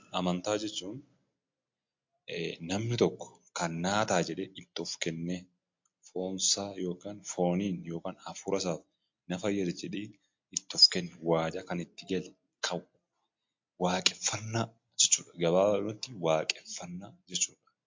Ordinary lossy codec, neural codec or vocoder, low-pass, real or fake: MP3, 48 kbps; none; 7.2 kHz; real